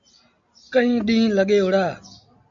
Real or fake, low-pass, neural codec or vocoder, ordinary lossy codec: real; 7.2 kHz; none; MP3, 64 kbps